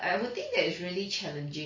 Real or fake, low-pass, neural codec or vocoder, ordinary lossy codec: real; 7.2 kHz; none; MP3, 32 kbps